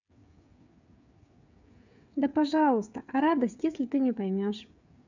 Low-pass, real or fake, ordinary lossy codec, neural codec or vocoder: 7.2 kHz; fake; none; codec, 16 kHz, 8 kbps, FreqCodec, smaller model